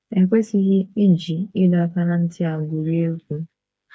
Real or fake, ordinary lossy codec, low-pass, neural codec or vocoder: fake; none; none; codec, 16 kHz, 4 kbps, FreqCodec, smaller model